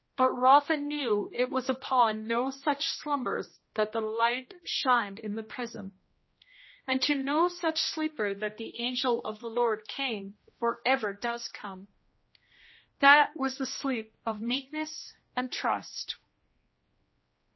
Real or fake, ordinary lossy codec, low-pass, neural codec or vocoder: fake; MP3, 24 kbps; 7.2 kHz; codec, 16 kHz, 1 kbps, X-Codec, HuBERT features, trained on general audio